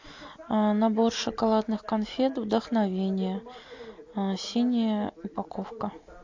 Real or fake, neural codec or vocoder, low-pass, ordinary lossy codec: real; none; 7.2 kHz; AAC, 48 kbps